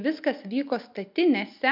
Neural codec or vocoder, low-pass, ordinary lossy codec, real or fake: none; 5.4 kHz; MP3, 48 kbps; real